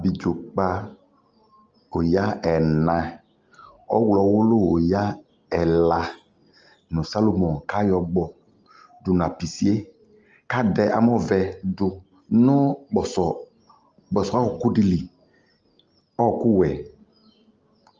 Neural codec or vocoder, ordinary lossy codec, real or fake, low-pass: none; Opus, 24 kbps; real; 7.2 kHz